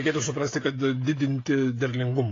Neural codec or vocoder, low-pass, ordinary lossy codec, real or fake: codec, 16 kHz, 16 kbps, FreqCodec, smaller model; 7.2 kHz; AAC, 32 kbps; fake